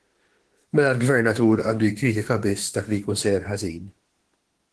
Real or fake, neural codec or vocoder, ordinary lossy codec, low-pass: fake; autoencoder, 48 kHz, 32 numbers a frame, DAC-VAE, trained on Japanese speech; Opus, 16 kbps; 10.8 kHz